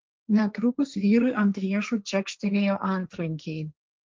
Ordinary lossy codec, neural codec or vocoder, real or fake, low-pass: Opus, 32 kbps; codec, 16 kHz, 1.1 kbps, Voila-Tokenizer; fake; 7.2 kHz